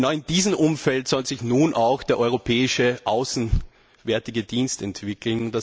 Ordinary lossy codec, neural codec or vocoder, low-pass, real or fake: none; none; none; real